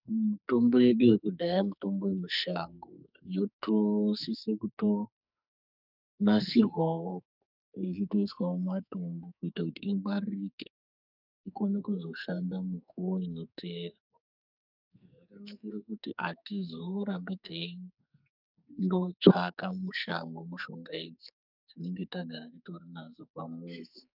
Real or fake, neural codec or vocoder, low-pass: fake; codec, 44.1 kHz, 2.6 kbps, SNAC; 5.4 kHz